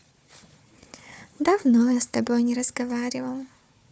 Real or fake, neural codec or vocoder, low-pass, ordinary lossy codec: fake; codec, 16 kHz, 4 kbps, FunCodec, trained on Chinese and English, 50 frames a second; none; none